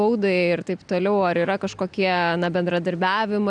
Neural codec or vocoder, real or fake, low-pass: none; real; 9.9 kHz